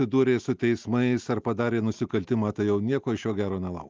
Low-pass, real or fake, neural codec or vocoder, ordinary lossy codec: 7.2 kHz; real; none; Opus, 24 kbps